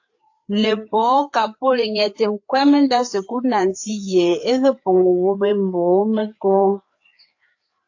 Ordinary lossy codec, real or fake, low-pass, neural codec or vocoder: AAC, 48 kbps; fake; 7.2 kHz; codec, 16 kHz, 4 kbps, FreqCodec, larger model